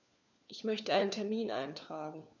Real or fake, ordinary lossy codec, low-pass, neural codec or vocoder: fake; none; 7.2 kHz; codec, 16 kHz, 4 kbps, FunCodec, trained on LibriTTS, 50 frames a second